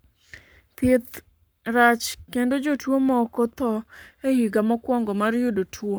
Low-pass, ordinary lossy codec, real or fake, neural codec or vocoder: none; none; fake; codec, 44.1 kHz, 7.8 kbps, Pupu-Codec